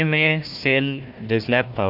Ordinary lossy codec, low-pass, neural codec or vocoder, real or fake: Opus, 64 kbps; 5.4 kHz; codec, 16 kHz, 1 kbps, FunCodec, trained on Chinese and English, 50 frames a second; fake